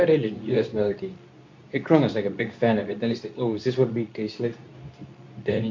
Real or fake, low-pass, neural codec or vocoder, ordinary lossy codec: fake; 7.2 kHz; codec, 24 kHz, 0.9 kbps, WavTokenizer, medium speech release version 2; MP3, 48 kbps